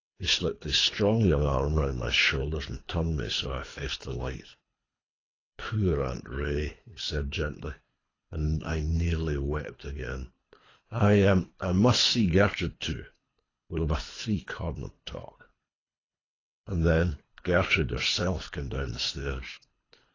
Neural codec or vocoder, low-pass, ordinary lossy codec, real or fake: codec, 24 kHz, 3 kbps, HILCodec; 7.2 kHz; AAC, 32 kbps; fake